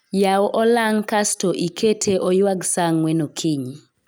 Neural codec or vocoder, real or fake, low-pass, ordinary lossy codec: none; real; none; none